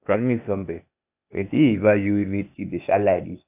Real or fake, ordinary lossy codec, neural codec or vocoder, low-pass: fake; none; codec, 16 kHz, 0.8 kbps, ZipCodec; 3.6 kHz